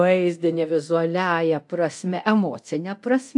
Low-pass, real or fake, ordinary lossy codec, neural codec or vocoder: 10.8 kHz; fake; AAC, 64 kbps; codec, 24 kHz, 0.9 kbps, DualCodec